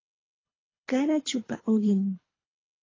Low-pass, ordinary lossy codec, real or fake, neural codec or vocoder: 7.2 kHz; AAC, 32 kbps; fake; codec, 24 kHz, 3 kbps, HILCodec